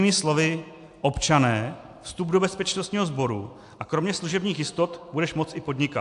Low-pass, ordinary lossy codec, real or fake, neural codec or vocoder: 10.8 kHz; AAC, 64 kbps; real; none